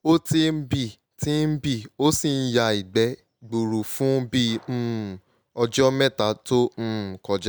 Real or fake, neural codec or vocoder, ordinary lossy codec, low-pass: real; none; none; none